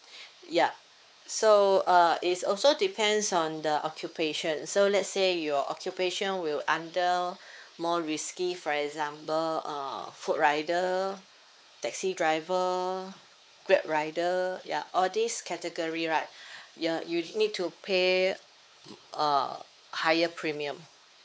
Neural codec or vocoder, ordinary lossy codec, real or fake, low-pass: codec, 16 kHz, 4 kbps, X-Codec, WavLM features, trained on Multilingual LibriSpeech; none; fake; none